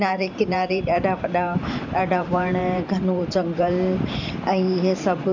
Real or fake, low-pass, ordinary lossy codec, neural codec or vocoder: fake; 7.2 kHz; none; vocoder, 44.1 kHz, 128 mel bands every 512 samples, BigVGAN v2